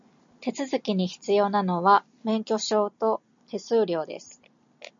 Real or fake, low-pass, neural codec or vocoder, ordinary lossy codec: real; 7.2 kHz; none; MP3, 64 kbps